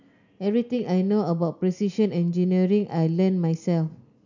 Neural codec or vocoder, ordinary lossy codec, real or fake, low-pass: none; none; real; 7.2 kHz